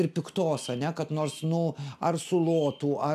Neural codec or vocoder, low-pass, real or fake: none; 14.4 kHz; real